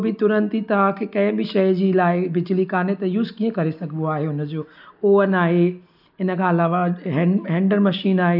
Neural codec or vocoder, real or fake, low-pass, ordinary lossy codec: none; real; 5.4 kHz; none